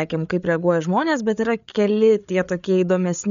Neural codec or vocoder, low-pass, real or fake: codec, 16 kHz, 8 kbps, FreqCodec, larger model; 7.2 kHz; fake